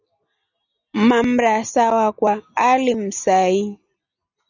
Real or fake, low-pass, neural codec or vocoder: real; 7.2 kHz; none